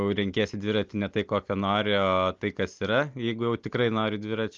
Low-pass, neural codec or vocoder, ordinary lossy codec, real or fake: 7.2 kHz; none; Opus, 32 kbps; real